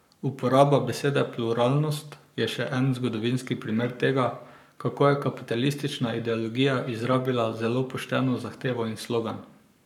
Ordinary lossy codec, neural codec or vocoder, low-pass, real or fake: none; codec, 44.1 kHz, 7.8 kbps, Pupu-Codec; 19.8 kHz; fake